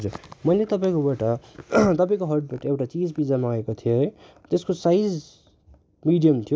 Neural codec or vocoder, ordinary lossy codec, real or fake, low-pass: none; none; real; none